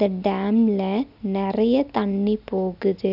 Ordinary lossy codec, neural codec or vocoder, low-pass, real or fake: none; none; 5.4 kHz; real